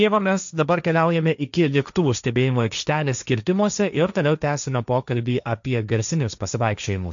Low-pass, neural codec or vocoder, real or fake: 7.2 kHz; codec, 16 kHz, 1.1 kbps, Voila-Tokenizer; fake